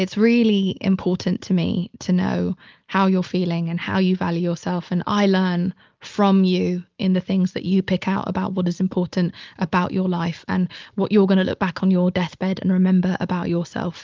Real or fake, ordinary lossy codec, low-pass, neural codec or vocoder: real; Opus, 24 kbps; 7.2 kHz; none